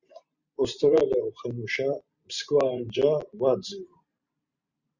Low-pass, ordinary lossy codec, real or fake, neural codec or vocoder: 7.2 kHz; Opus, 64 kbps; fake; vocoder, 44.1 kHz, 128 mel bands every 512 samples, BigVGAN v2